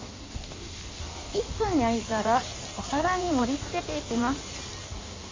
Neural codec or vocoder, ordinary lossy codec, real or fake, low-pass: codec, 16 kHz in and 24 kHz out, 1.1 kbps, FireRedTTS-2 codec; AAC, 32 kbps; fake; 7.2 kHz